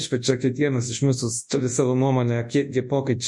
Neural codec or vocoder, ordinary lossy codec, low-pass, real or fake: codec, 24 kHz, 0.9 kbps, WavTokenizer, large speech release; MP3, 48 kbps; 10.8 kHz; fake